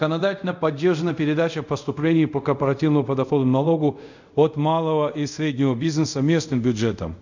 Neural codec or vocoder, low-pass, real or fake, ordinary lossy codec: codec, 24 kHz, 0.5 kbps, DualCodec; 7.2 kHz; fake; none